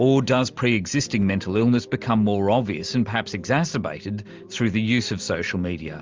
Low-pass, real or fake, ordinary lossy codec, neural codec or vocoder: 7.2 kHz; real; Opus, 32 kbps; none